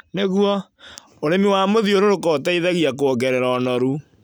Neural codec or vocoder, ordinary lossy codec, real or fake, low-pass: none; none; real; none